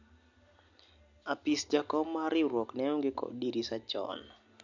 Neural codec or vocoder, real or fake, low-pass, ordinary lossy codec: none; real; 7.2 kHz; MP3, 64 kbps